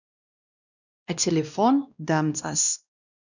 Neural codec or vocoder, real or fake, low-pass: codec, 16 kHz, 1 kbps, X-Codec, WavLM features, trained on Multilingual LibriSpeech; fake; 7.2 kHz